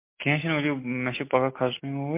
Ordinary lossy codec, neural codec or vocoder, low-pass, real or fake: MP3, 24 kbps; none; 3.6 kHz; real